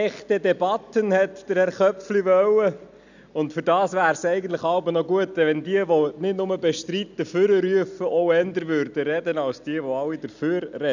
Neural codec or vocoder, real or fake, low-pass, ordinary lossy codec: none; real; 7.2 kHz; none